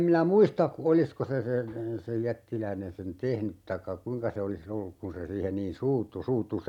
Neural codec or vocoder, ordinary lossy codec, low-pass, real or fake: none; none; 19.8 kHz; real